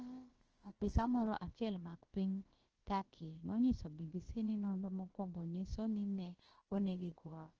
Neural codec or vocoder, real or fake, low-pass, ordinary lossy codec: codec, 16 kHz, about 1 kbps, DyCAST, with the encoder's durations; fake; 7.2 kHz; Opus, 16 kbps